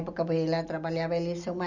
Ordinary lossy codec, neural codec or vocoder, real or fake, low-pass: none; none; real; 7.2 kHz